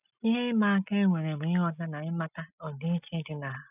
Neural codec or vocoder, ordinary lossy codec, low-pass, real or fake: none; none; 3.6 kHz; real